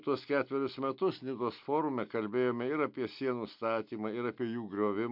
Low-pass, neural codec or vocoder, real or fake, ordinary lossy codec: 5.4 kHz; none; real; MP3, 48 kbps